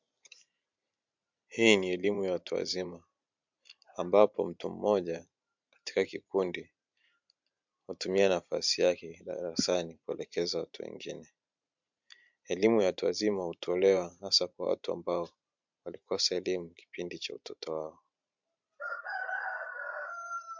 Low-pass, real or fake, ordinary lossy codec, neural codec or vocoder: 7.2 kHz; fake; MP3, 64 kbps; vocoder, 44.1 kHz, 128 mel bands every 512 samples, BigVGAN v2